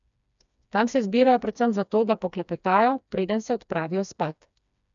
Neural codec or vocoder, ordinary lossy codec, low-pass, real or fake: codec, 16 kHz, 2 kbps, FreqCodec, smaller model; none; 7.2 kHz; fake